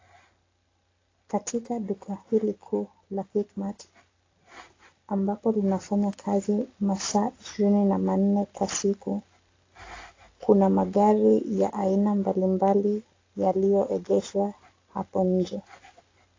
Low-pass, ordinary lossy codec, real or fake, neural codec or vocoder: 7.2 kHz; AAC, 32 kbps; real; none